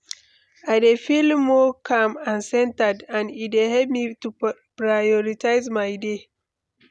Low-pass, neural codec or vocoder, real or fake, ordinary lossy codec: none; none; real; none